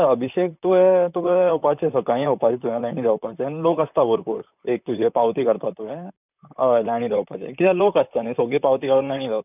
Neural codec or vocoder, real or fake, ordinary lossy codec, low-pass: vocoder, 44.1 kHz, 128 mel bands, Pupu-Vocoder; fake; none; 3.6 kHz